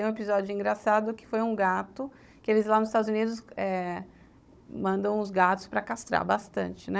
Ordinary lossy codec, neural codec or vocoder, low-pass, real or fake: none; codec, 16 kHz, 16 kbps, FunCodec, trained on Chinese and English, 50 frames a second; none; fake